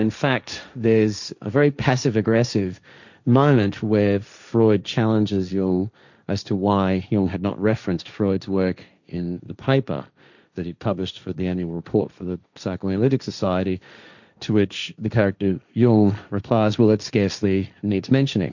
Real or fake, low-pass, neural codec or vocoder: fake; 7.2 kHz; codec, 16 kHz, 1.1 kbps, Voila-Tokenizer